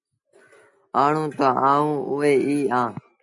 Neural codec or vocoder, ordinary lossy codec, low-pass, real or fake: none; MP3, 48 kbps; 10.8 kHz; real